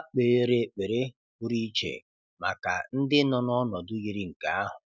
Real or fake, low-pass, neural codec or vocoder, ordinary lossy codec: real; none; none; none